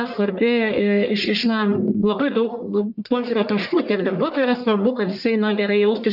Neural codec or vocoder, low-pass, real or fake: codec, 44.1 kHz, 1.7 kbps, Pupu-Codec; 5.4 kHz; fake